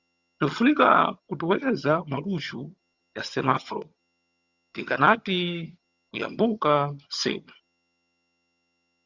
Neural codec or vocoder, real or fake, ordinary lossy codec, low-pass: vocoder, 22.05 kHz, 80 mel bands, HiFi-GAN; fake; Opus, 64 kbps; 7.2 kHz